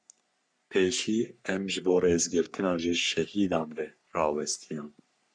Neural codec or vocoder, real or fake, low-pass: codec, 44.1 kHz, 3.4 kbps, Pupu-Codec; fake; 9.9 kHz